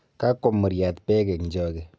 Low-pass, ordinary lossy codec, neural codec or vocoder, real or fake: none; none; none; real